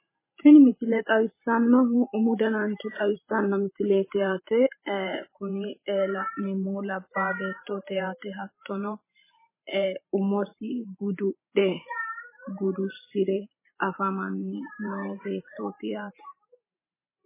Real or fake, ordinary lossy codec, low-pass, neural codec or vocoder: fake; MP3, 16 kbps; 3.6 kHz; vocoder, 44.1 kHz, 128 mel bands every 512 samples, BigVGAN v2